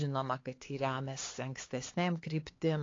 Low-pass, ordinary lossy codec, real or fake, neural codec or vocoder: 7.2 kHz; AAC, 32 kbps; fake; codec, 16 kHz, 4 kbps, X-Codec, HuBERT features, trained on LibriSpeech